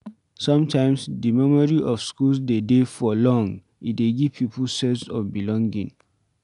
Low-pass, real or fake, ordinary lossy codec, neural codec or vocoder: 10.8 kHz; real; none; none